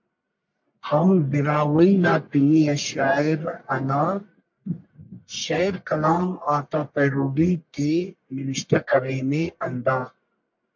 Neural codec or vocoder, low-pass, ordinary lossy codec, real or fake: codec, 44.1 kHz, 1.7 kbps, Pupu-Codec; 7.2 kHz; MP3, 48 kbps; fake